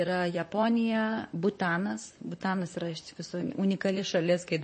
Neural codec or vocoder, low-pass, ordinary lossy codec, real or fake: vocoder, 44.1 kHz, 128 mel bands, Pupu-Vocoder; 10.8 kHz; MP3, 32 kbps; fake